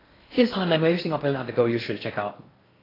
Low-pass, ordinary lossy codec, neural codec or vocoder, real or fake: 5.4 kHz; AAC, 24 kbps; codec, 16 kHz in and 24 kHz out, 0.6 kbps, FocalCodec, streaming, 4096 codes; fake